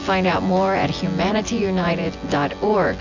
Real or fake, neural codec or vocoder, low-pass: fake; vocoder, 24 kHz, 100 mel bands, Vocos; 7.2 kHz